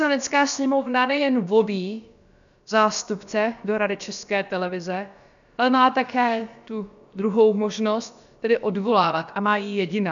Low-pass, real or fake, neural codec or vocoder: 7.2 kHz; fake; codec, 16 kHz, about 1 kbps, DyCAST, with the encoder's durations